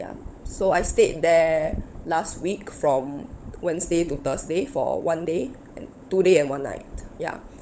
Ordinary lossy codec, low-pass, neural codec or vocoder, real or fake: none; none; codec, 16 kHz, 16 kbps, FunCodec, trained on LibriTTS, 50 frames a second; fake